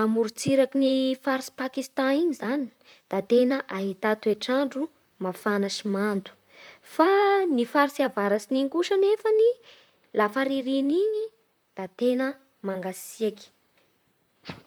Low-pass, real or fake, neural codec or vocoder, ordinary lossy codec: none; fake; vocoder, 44.1 kHz, 128 mel bands, Pupu-Vocoder; none